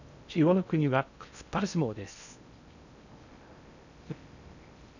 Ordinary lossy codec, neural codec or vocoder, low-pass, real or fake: none; codec, 16 kHz in and 24 kHz out, 0.6 kbps, FocalCodec, streaming, 2048 codes; 7.2 kHz; fake